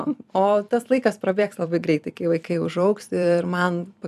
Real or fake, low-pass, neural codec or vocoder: fake; 14.4 kHz; vocoder, 44.1 kHz, 128 mel bands every 256 samples, BigVGAN v2